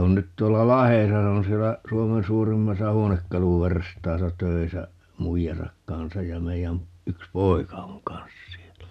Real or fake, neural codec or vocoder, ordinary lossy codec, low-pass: real; none; MP3, 96 kbps; 14.4 kHz